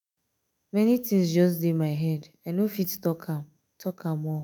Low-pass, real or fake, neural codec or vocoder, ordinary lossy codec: none; fake; autoencoder, 48 kHz, 128 numbers a frame, DAC-VAE, trained on Japanese speech; none